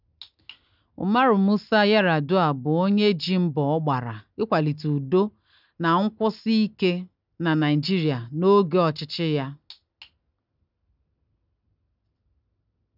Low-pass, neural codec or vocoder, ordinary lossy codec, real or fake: 5.4 kHz; none; none; real